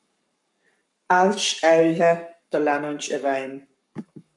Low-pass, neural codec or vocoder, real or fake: 10.8 kHz; codec, 44.1 kHz, 7.8 kbps, Pupu-Codec; fake